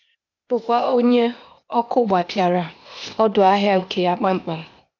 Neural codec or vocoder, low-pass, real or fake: codec, 16 kHz, 0.8 kbps, ZipCodec; 7.2 kHz; fake